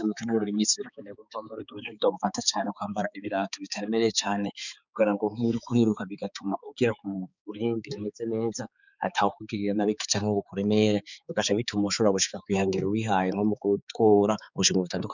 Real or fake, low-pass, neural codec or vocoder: fake; 7.2 kHz; codec, 16 kHz, 4 kbps, X-Codec, HuBERT features, trained on balanced general audio